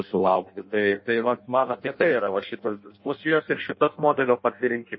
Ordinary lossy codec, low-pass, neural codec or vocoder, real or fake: MP3, 24 kbps; 7.2 kHz; codec, 16 kHz in and 24 kHz out, 0.6 kbps, FireRedTTS-2 codec; fake